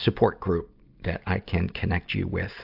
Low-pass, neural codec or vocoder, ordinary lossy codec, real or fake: 5.4 kHz; none; AAC, 48 kbps; real